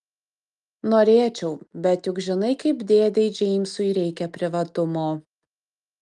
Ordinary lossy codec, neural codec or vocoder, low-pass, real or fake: Opus, 32 kbps; none; 10.8 kHz; real